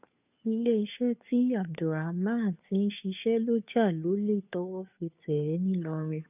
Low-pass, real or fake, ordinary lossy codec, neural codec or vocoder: 3.6 kHz; fake; none; codec, 16 kHz, 2 kbps, FunCodec, trained on Chinese and English, 25 frames a second